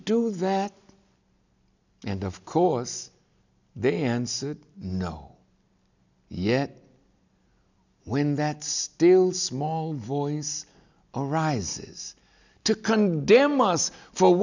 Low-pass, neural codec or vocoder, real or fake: 7.2 kHz; none; real